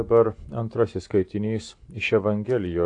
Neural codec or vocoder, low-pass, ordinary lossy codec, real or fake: none; 9.9 kHz; AAC, 48 kbps; real